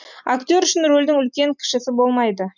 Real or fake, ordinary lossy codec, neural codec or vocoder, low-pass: real; none; none; 7.2 kHz